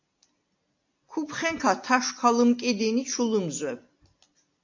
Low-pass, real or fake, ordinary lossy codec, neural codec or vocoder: 7.2 kHz; real; AAC, 48 kbps; none